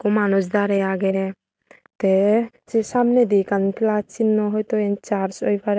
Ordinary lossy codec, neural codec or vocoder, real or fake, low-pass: none; none; real; none